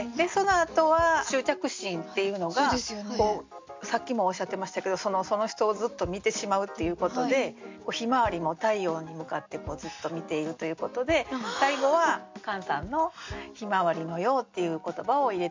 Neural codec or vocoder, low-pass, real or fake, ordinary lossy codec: none; 7.2 kHz; real; MP3, 64 kbps